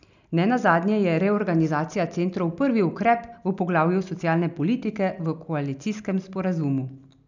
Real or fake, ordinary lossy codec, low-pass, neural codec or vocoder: real; none; 7.2 kHz; none